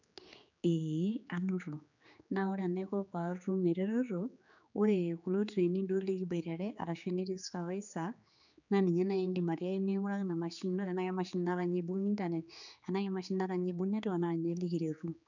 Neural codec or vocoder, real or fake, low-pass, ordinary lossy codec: codec, 16 kHz, 4 kbps, X-Codec, HuBERT features, trained on general audio; fake; 7.2 kHz; none